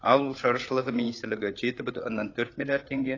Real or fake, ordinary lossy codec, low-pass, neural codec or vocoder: fake; AAC, 32 kbps; 7.2 kHz; codec, 16 kHz, 8 kbps, FreqCodec, larger model